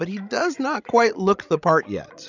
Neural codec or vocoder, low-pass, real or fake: codec, 16 kHz, 16 kbps, FreqCodec, larger model; 7.2 kHz; fake